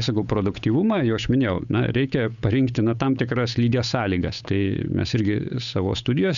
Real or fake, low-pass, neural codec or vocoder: fake; 7.2 kHz; codec, 16 kHz, 8 kbps, FunCodec, trained on Chinese and English, 25 frames a second